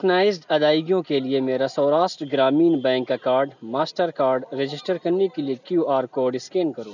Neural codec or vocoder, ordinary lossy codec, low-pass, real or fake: none; none; 7.2 kHz; real